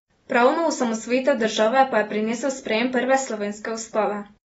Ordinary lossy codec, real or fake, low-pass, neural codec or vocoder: AAC, 24 kbps; real; 19.8 kHz; none